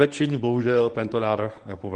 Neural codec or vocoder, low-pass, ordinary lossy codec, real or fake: codec, 24 kHz, 0.9 kbps, WavTokenizer, medium speech release version 1; 10.8 kHz; Opus, 24 kbps; fake